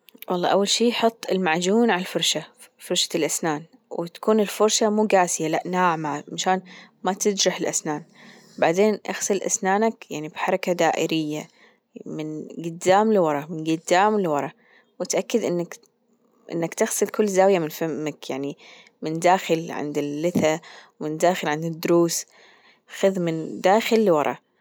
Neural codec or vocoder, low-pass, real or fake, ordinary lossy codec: none; none; real; none